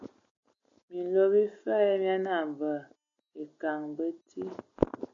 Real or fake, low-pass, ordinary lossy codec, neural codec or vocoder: real; 7.2 kHz; MP3, 64 kbps; none